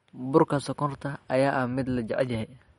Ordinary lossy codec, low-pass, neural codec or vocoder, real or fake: MP3, 48 kbps; 14.4 kHz; none; real